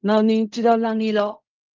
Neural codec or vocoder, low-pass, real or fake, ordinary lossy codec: codec, 16 kHz in and 24 kHz out, 0.4 kbps, LongCat-Audio-Codec, fine tuned four codebook decoder; 7.2 kHz; fake; Opus, 32 kbps